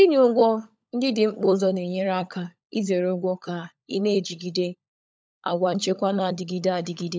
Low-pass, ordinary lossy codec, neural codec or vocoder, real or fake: none; none; codec, 16 kHz, 16 kbps, FunCodec, trained on LibriTTS, 50 frames a second; fake